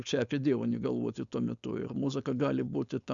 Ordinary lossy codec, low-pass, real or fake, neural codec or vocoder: MP3, 96 kbps; 7.2 kHz; fake; codec, 16 kHz, 4.8 kbps, FACodec